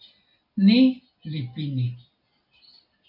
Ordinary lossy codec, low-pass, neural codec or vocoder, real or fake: MP3, 48 kbps; 5.4 kHz; none; real